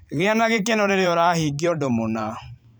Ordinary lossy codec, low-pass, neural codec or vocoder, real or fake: none; none; vocoder, 44.1 kHz, 128 mel bands every 512 samples, BigVGAN v2; fake